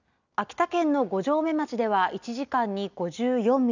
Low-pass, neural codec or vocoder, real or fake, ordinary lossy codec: 7.2 kHz; none; real; none